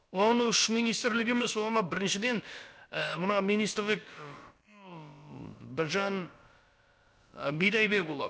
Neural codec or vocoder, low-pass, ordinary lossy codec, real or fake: codec, 16 kHz, about 1 kbps, DyCAST, with the encoder's durations; none; none; fake